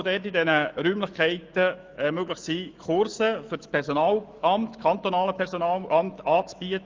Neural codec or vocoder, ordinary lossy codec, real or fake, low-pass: autoencoder, 48 kHz, 128 numbers a frame, DAC-VAE, trained on Japanese speech; Opus, 24 kbps; fake; 7.2 kHz